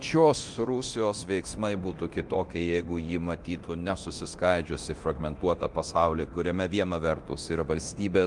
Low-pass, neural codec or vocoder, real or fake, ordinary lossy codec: 10.8 kHz; codec, 24 kHz, 0.9 kbps, DualCodec; fake; Opus, 24 kbps